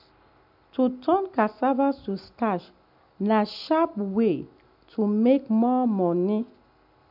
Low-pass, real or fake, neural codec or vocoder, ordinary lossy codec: 5.4 kHz; real; none; MP3, 48 kbps